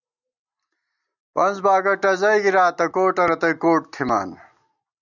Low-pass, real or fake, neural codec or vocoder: 7.2 kHz; real; none